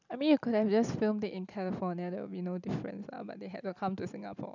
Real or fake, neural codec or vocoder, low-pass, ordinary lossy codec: real; none; 7.2 kHz; none